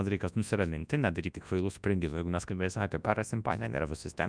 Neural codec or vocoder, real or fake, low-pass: codec, 24 kHz, 0.9 kbps, WavTokenizer, large speech release; fake; 9.9 kHz